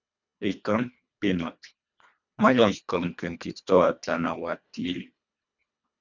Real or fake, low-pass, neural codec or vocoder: fake; 7.2 kHz; codec, 24 kHz, 1.5 kbps, HILCodec